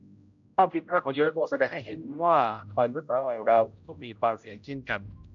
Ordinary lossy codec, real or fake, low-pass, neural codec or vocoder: MP3, 64 kbps; fake; 7.2 kHz; codec, 16 kHz, 0.5 kbps, X-Codec, HuBERT features, trained on general audio